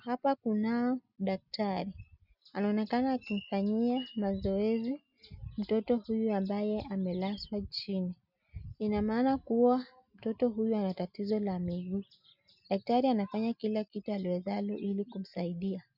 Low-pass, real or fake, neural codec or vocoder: 5.4 kHz; real; none